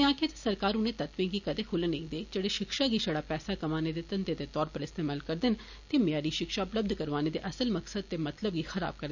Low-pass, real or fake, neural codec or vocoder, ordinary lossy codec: 7.2 kHz; real; none; none